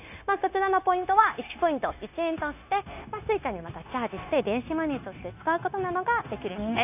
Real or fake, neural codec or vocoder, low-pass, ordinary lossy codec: fake; codec, 16 kHz, 0.9 kbps, LongCat-Audio-Codec; 3.6 kHz; none